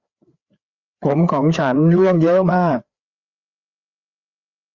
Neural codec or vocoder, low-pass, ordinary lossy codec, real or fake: codec, 16 kHz, 4 kbps, FreqCodec, larger model; 7.2 kHz; Opus, 64 kbps; fake